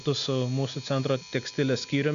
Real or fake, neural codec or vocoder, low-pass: real; none; 7.2 kHz